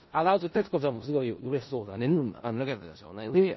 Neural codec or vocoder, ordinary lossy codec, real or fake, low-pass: codec, 16 kHz in and 24 kHz out, 0.4 kbps, LongCat-Audio-Codec, four codebook decoder; MP3, 24 kbps; fake; 7.2 kHz